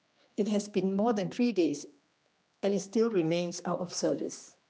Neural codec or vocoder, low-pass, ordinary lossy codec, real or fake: codec, 16 kHz, 2 kbps, X-Codec, HuBERT features, trained on general audio; none; none; fake